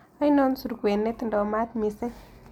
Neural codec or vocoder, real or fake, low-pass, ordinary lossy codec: none; real; 19.8 kHz; none